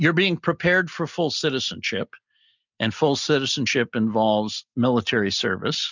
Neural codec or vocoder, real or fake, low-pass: none; real; 7.2 kHz